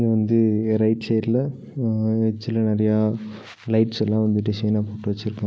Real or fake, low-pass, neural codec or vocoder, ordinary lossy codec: real; none; none; none